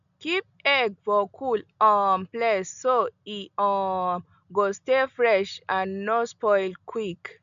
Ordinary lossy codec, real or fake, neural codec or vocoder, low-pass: MP3, 96 kbps; real; none; 7.2 kHz